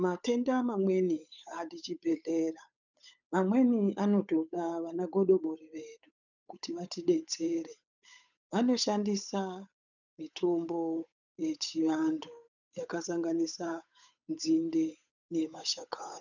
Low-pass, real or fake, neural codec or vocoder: 7.2 kHz; fake; codec, 16 kHz, 8 kbps, FunCodec, trained on Chinese and English, 25 frames a second